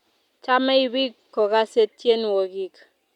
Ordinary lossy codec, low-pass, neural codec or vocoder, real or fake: none; 19.8 kHz; none; real